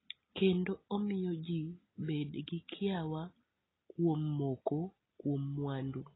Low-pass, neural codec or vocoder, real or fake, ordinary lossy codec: 7.2 kHz; none; real; AAC, 16 kbps